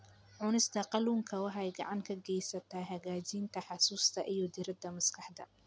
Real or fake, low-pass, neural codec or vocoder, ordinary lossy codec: real; none; none; none